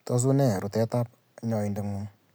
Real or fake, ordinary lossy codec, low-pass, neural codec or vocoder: real; none; none; none